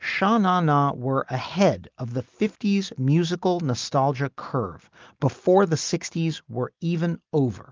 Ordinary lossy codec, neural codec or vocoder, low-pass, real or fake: Opus, 32 kbps; none; 7.2 kHz; real